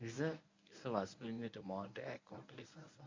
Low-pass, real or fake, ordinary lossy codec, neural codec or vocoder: 7.2 kHz; fake; MP3, 48 kbps; codec, 24 kHz, 0.9 kbps, WavTokenizer, medium speech release version 1